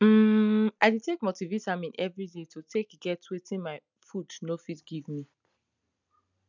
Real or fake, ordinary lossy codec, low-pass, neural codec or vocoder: real; none; 7.2 kHz; none